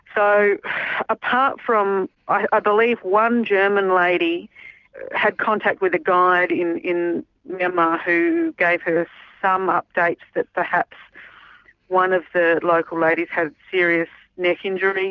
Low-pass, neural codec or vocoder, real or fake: 7.2 kHz; none; real